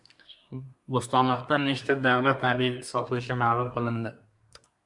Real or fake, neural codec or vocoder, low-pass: fake; codec, 24 kHz, 1 kbps, SNAC; 10.8 kHz